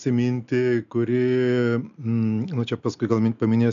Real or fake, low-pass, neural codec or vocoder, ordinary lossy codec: real; 7.2 kHz; none; AAC, 96 kbps